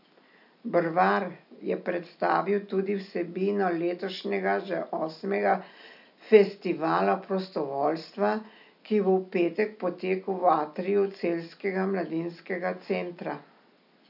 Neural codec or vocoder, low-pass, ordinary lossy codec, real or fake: none; 5.4 kHz; none; real